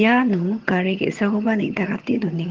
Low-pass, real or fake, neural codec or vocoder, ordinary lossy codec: 7.2 kHz; fake; vocoder, 22.05 kHz, 80 mel bands, HiFi-GAN; Opus, 16 kbps